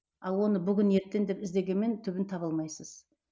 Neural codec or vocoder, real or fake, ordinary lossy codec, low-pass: none; real; none; none